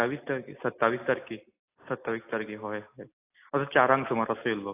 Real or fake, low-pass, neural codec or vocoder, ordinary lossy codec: real; 3.6 kHz; none; AAC, 24 kbps